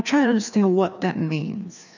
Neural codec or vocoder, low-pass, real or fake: codec, 16 kHz, 2 kbps, FreqCodec, larger model; 7.2 kHz; fake